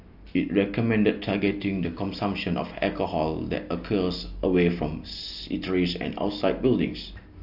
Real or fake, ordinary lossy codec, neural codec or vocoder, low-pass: real; AAC, 48 kbps; none; 5.4 kHz